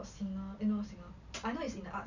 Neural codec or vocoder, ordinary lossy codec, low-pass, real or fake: none; none; 7.2 kHz; real